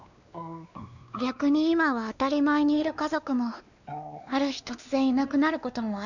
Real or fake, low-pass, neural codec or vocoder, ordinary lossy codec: fake; 7.2 kHz; codec, 16 kHz, 2 kbps, X-Codec, WavLM features, trained on Multilingual LibriSpeech; none